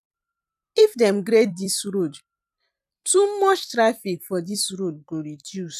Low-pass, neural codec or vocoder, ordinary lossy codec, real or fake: 14.4 kHz; vocoder, 44.1 kHz, 128 mel bands every 256 samples, BigVGAN v2; none; fake